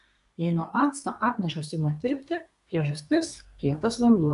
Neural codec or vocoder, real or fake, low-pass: codec, 24 kHz, 1 kbps, SNAC; fake; 10.8 kHz